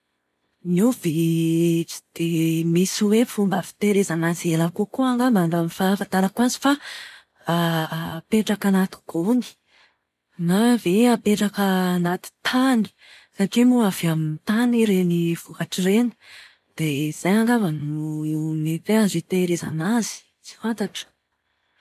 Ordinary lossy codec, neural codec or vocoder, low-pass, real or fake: none; none; 14.4 kHz; real